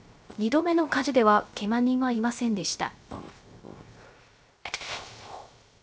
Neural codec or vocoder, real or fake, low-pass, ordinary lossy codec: codec, 16 kHz, 0.3 kbps, FocalCodec; fake; none; none